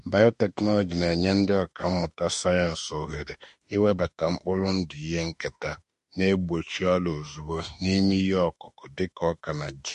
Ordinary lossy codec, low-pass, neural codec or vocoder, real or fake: MP3, 48 kbps; 14.4 kHz; autoencoder, 48 kHz, 32 numbers a frame, DAC-VAE, trained on Japanese speech; fake